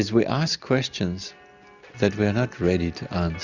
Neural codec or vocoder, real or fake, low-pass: none; real; 7.2 kHz